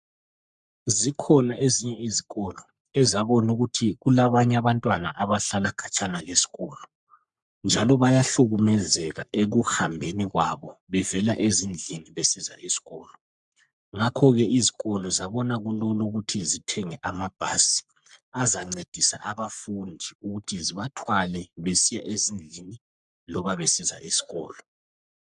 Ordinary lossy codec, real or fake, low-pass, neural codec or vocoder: MP3, 96 kbps; fake; 10.8 kHz; codec, 44.1 kHz, 3.4 kbps, Pupu-Codec